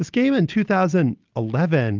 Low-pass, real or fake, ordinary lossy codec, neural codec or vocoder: 7.2 kHz; real; Opus, 32 kbps; none